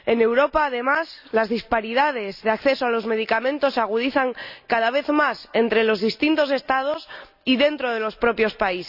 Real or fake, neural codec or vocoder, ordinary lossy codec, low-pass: real; none; none; 5.4 kHz